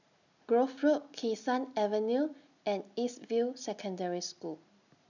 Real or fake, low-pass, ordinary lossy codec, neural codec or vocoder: real; 7.2 kHz; none; none